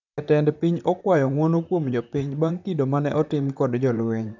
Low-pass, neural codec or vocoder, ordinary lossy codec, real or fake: 7.2 kHz; vocoder, 44.1 kHz, 128 mel bands, Pupu-Vocoder; none; fake